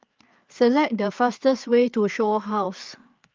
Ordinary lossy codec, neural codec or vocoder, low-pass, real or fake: Opus, 24 kbps; codec, 16 kHz, 4 kbps, FreqCodec, larger model; 7.2 kHz; fake